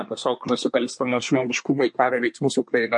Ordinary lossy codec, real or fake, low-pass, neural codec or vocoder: MP3, 48 kbps; fake; 10.8 kHz; codec, 24 kHz, 1 kbps, SNAC